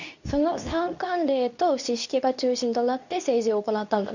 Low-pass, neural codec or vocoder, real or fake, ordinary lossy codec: 7.2 kHz; codec, 24 kHz, 0.9 kbps, WavTokenizer, medium speech release version 2; fake; none